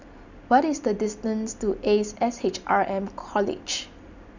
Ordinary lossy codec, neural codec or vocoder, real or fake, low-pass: none; none; real; 7.2 kHz